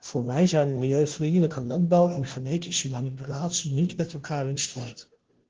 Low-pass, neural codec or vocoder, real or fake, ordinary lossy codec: 7.2 kHz; codec, 16 kHz, 0.5 kbps, FunCodec, trained on Chinese and English, 25 frames a second; fake; Opus, 16 kbps